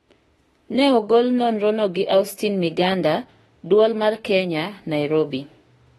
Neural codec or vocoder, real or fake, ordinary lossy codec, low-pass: autoencoder, 48 kHz, 32 numbers a frame, DAC-VAE, trained on Japanese speech; fake; AAC, 32 kbps; 19.8 kHz